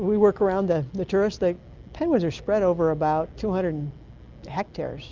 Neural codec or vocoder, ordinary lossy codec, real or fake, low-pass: none; Opus, 32 kbps; real; 7.2 kHz